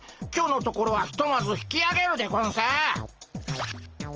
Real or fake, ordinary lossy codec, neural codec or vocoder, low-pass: real; Opus, 24 kbps; none; 7.2 kHz